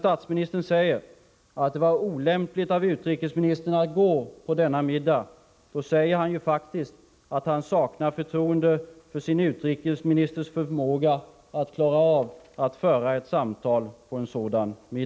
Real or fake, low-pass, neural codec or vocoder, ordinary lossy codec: real; none; none; none